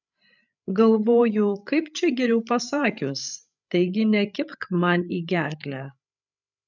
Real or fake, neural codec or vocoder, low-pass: fake; codec, 16 kHz, 8 kbps, FreqCodec, larger model; 7.2 kHz